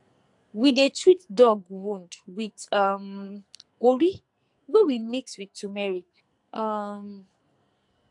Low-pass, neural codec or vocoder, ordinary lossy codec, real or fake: 10.8 kHz; codec, 44.1 kHz, 2.6 kbps, SNAC; AAC, 64 kbps; fake